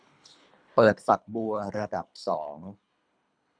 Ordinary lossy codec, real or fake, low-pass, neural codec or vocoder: none; fake; 9.9 kHz; codec, 24 kHz, 3 kbps, HILCodec